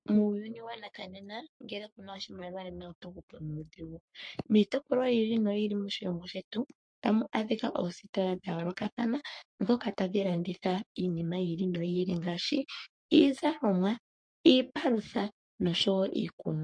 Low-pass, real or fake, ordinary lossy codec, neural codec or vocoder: 9.9 kHz; fake; MP3, 48 kbps; codec, 44.1 kHz, 3.4 kbps, Pupu-Codec